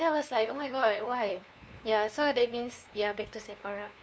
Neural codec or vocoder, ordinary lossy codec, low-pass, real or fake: codec, 16 kHz, 2 kbps, FunCodec, trained on LibriTTS, 25 frames a second; none; none; fake